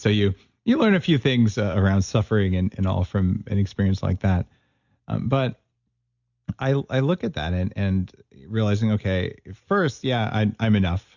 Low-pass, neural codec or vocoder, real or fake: 7.2 kHz; none; real